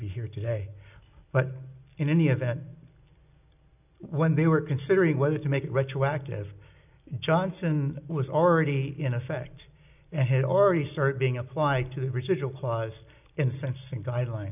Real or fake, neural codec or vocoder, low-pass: real; none; 3.6 kHz